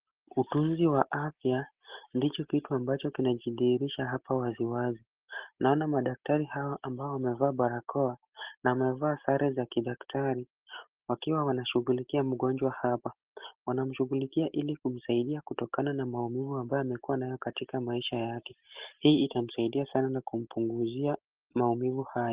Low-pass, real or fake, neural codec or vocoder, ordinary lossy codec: 3.6 kHz; real; none; Opus, 16 kbps